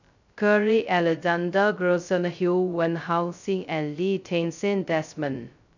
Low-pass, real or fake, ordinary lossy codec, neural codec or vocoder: 7.2 kHz; fake; none; codec, 16 kHz, 0.2 kbps, FocalCodec